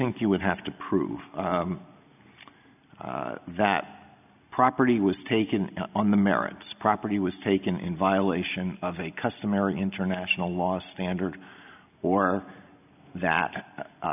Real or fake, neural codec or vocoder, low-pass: real; none; 3.6 kHz